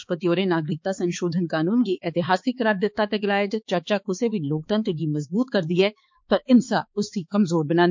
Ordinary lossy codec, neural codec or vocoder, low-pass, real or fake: MP3, 48 kbps; codec, 16 kHz, 4 kbps, X-Codec, HuBERT features, trained on balanced general audio; 7.2 kHz; fake